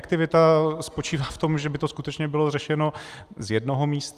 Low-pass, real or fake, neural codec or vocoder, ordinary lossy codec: 14.4 kHz; real; none; Opus, 32 kbps